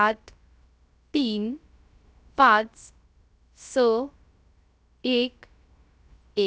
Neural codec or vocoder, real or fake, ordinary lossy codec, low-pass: codec, 16 kHz, 0.3 kbps, FocalCodec; fake; none; none